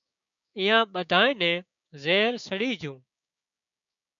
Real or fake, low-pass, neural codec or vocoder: fake; 7.2 kHz; codec, 16 kHz, 6 kbps, DAC